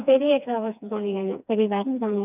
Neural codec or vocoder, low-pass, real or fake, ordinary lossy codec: codec, 16 kHz, 2 kbps, FreqCodec, smaller model; 3.6 kHz; fake; none